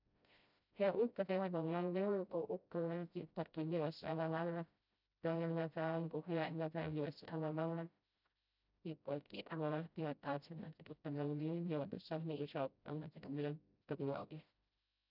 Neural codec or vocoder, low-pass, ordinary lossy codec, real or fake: codec, 16 kHz, 0.5 kbps, FreqCodec, smaller model; 5.4 kHz; none; fake